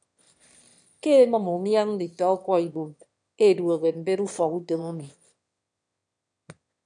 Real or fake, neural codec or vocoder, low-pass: fake; autoencoder, 22.05 kHz, a latent of 192 numbers a frame, VITS, trained on one speaker; 9.9 kHz